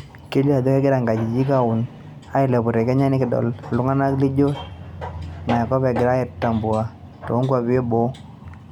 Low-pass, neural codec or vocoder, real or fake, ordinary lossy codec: 19.8 kHz; none; real; none